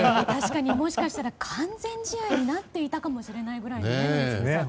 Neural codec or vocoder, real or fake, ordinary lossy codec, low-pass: none; real; none; none